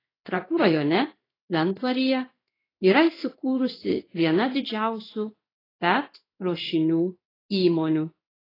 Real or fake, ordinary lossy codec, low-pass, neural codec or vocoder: fake; AAC, 24 kbps; 5.4 kHz; codec, 16 kHz in and 24 kHz out, 1 kbps, XY-Tokenizer